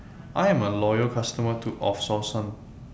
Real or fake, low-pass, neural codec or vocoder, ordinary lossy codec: real; none; none; none